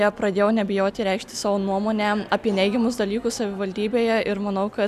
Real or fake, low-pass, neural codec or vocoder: real; 14.4 kHz; none